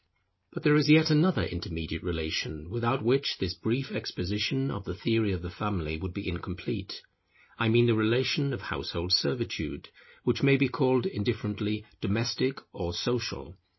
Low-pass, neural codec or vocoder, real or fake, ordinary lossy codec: 7.2 kHz; none; real; MP3, 24 kbps